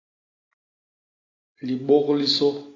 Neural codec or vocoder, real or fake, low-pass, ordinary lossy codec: none; real; 7.2 kHz; AAC, 32 kbps